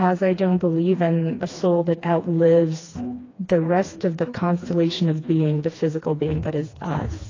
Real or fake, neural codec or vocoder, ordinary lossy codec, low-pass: fake; codec, 16 kHz, 2 kbps, FreqCodec, smaller model; AAC, 32 kbps; 7.2 kHz